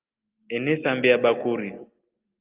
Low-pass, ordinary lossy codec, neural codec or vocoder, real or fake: 3.6 kHz; Opus, 24 kbps; none; real